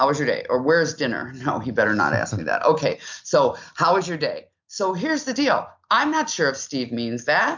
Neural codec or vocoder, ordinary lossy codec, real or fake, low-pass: none; MP3, 64 kbps; real; 7.2 kHz